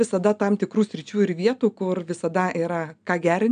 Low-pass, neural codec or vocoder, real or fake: 9.9 kHz; none; real